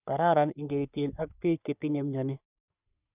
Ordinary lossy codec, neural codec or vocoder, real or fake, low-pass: none; codec, 44.1 kHz, 3.4 kbps, Pupu-Codec; fake; 3.6 kHz